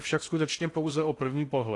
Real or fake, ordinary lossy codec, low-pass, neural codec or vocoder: fake; AAC, 48 kbps; 10.8 kHz; codec, 16 kHz in and 24 kHz out, 0.6 kbps, FocalCodec, streaming, 2048 codes